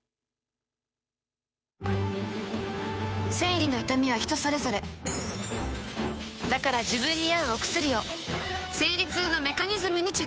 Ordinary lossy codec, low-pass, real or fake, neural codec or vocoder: none; none; fake; codec, 16 kHz, 2 kbps, FunCodec, trained on Chinese and English, 25 frames a second